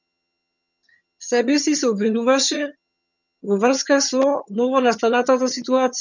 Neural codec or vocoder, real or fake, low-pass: vocoder, 22.05 kHz, 80 mel bands, HiFi-GAN; fake; 7.2 kHz